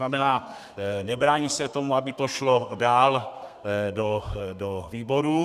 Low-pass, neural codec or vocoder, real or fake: 14.4 kHz; codec, 32 kHz, 1.9 kbps, SNAC; fake